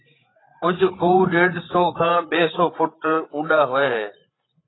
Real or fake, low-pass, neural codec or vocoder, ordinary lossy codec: fake; 7.2 kHz; codec, 16 kHz, 8 kbps, FreqCodec, larger model; AAC, 16 kbps